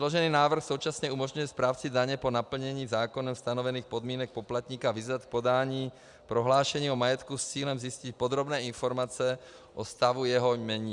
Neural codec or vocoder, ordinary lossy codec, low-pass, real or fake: none; Opus, 64 kbps; 10.8 kHz; real